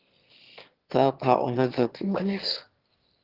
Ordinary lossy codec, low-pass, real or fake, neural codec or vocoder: Opus, 16 kbps; 5.4 kHz; fake; autoencoder, 22.05 kHz, a latent of 192 numbers a frame, VITS, trained on one speaker